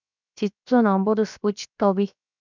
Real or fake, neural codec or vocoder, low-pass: fake; codec, 16 kHz, 0.7 kbps, FocalCodec; 7.2 kHz